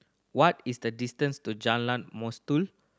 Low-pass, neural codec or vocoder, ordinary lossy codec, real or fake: none; none; none; real